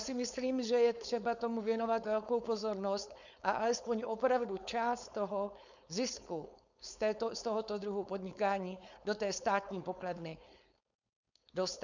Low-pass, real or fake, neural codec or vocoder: 7.2 kHz; fake; codec, 16 kHz, 4.8 kbps, FACodec